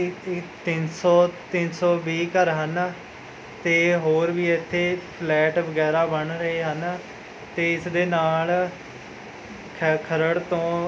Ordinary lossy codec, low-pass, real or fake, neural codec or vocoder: none; none; real; none